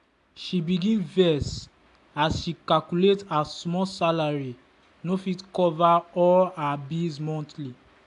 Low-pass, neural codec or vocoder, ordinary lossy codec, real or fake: 10.8 kHz; none; AAC, 64 kbps; real